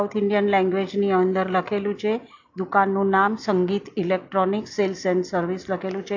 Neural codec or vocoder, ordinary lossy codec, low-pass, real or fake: none; AAC, 48 kbps; 7.2 kHz; real